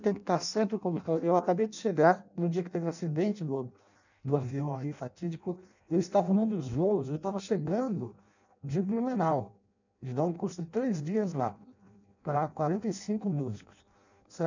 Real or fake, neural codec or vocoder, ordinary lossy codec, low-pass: fake; codec, 16 kHz in and 24 kHz out, 0.6 kbps, FireRedTTS-2 codec; none; 7.2 kHz